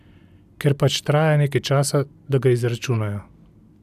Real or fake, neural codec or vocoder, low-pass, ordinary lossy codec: real; none; 14.4 kHz; none